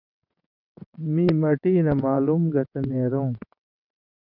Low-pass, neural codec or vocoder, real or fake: 5.4 kHz; vocoder, 22.05 kHz, 80 mel bands, WaveNeXt; fake